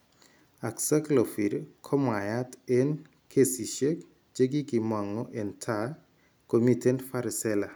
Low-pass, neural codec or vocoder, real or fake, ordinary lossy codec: none; none; real; none